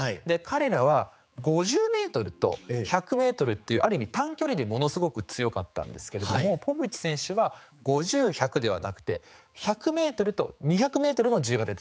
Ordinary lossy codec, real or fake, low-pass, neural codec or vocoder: none; fake; none; codec, 16 kHz, 4 kbps, X-Codec, HuBERT features, trained on general audio